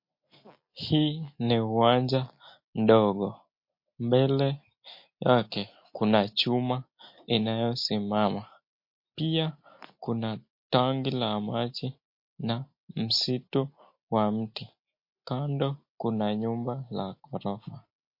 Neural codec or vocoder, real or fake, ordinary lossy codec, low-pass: none; real; MP3, 32 kbps; 5.4 kHz